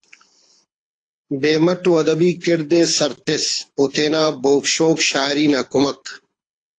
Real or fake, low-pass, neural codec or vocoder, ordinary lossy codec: fake; 9.9 kHz; codec, 24 kHz, 6 kbps, HILCodec; AAC, 48 kbps